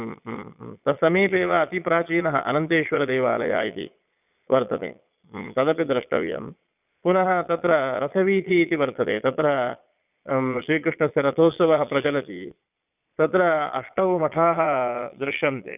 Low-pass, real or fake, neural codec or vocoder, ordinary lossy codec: 3.6 kHz; fake; vocoder, 22.05 kHz, 80 mel bands, Vocos; none